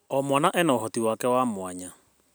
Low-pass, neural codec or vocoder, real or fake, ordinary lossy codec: none; none; real; none